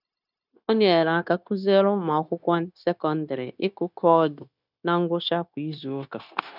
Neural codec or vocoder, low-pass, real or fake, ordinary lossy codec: codec, 16 kHz, 0.9 kbps, LongCat-Audio-Codec; 5.4 kHz; fake; none